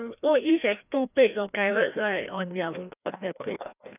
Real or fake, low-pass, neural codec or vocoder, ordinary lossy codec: fake; 3.6 kHz; codec, 16 kHz, 1 kbps, FreqCodec, larger model; none